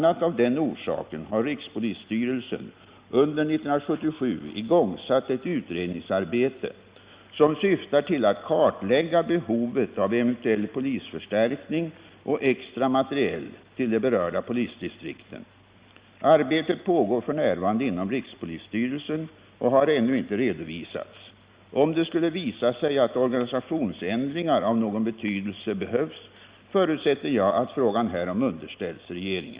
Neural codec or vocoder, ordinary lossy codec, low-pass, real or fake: none; Opus, 64 kbps; 3.6 kHz; real